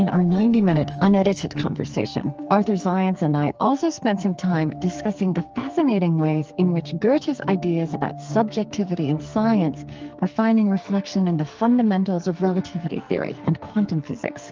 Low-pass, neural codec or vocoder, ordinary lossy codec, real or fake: 7.2 kHz; codec, 44.1 kHz, 2.6 kbps, SNAC; Opus, 24 kbps; fake